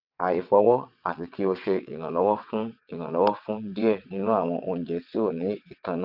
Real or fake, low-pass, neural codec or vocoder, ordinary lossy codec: fake; 5.4 kHz; vocoder, 22.05 kHz, 80 mel bands, WaveNeXt; none